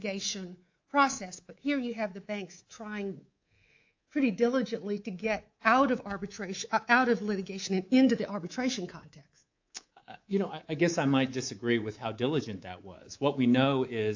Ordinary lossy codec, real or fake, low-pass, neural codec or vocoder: AAC, 48 kbps; fake; 7.2 kHz; codec, 24 kHz, 3.1 kbps, DualCodec